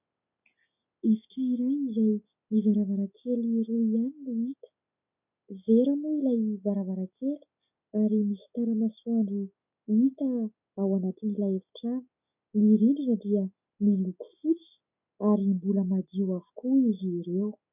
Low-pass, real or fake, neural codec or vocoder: 3.6 kHz; real; none